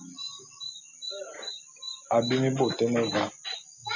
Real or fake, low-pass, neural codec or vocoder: real; 7.2 kHz; none